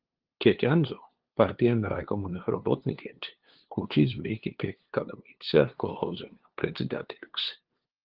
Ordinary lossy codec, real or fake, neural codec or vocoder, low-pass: Opus, 16 kbps; fake; codec, 16 kHz, 2 kbps, FunCodec, trained on LibriTTS, 25 frames a second; 5.4 kHz